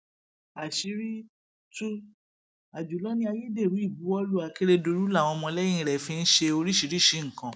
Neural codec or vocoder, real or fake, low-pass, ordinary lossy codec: none; real; none; none